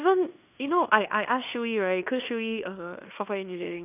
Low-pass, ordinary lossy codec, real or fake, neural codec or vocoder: 3.6 kHz; none; fake; codec, 16 kHz in and 24 kHz out, 0.9 kbps, LongCat-Audio-Codec, fine tuned four codebook decoder